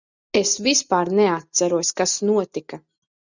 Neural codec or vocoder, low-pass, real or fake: none; 7.2 kHz; real